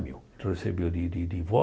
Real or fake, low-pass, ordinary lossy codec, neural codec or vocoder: real; none; none; none